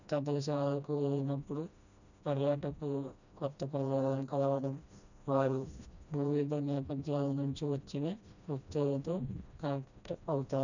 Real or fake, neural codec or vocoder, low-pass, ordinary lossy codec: fake; codec, 16 kHz, 1 kbps, FreqCodec, smaller model; 7.2 kHz; none